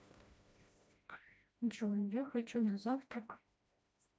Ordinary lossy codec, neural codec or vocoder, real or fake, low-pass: none; codec, 16 kHz, 1 kbps, FreqCodec, smaller model; fake; none